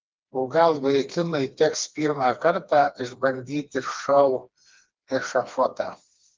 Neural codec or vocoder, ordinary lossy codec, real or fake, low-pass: codec, 16 kHz, 2 kbps, FreqCodec, smaller model; Opus, 32 kbps; fake; 7.2 kHz